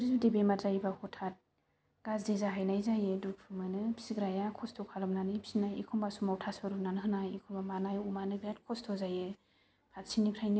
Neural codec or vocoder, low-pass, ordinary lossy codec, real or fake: none; none; none; real